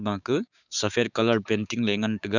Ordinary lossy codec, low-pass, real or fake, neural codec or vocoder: none; 7.2 kHz; fake; codec, 16 kHz, 6 kbps, DAC